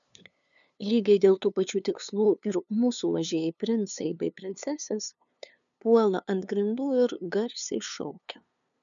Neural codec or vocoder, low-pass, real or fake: codec, 16 kHz, 2 kbps, FunCodec, trained on LibriTTS, 25 frames a second; 7.2 kHz; fake